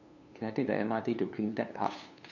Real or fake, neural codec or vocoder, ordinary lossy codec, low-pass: fake; codec, 16 kHz, 2 kbps, FunCodec, trained on LibriTTS, 25 frames a second; none; 7.2 kHz